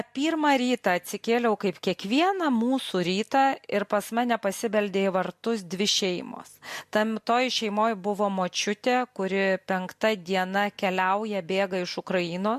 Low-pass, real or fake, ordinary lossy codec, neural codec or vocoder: 14.4 kHz; real; MP3, 64 kbps; none